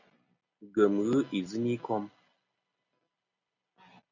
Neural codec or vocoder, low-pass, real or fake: none; 7.2 kHz; real